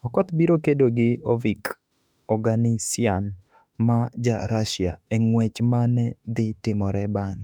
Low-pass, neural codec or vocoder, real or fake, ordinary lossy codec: 19.8 kHz; autoencoder, 48 kHz, 32 numbers a frame, DAC-VAE, trained on Japanese speech; fake; none